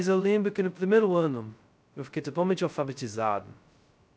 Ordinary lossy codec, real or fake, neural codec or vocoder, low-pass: none; fake; codec, 16 kHz, 0.2 kbps, FocalCodec; none